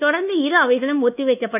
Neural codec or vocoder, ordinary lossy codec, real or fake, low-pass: codec, 24 kHz, 1.2 kbps, DualCodec; none; fake; 3.6 kHz